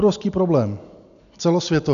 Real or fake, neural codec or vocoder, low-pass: real; none; 7.2 kHz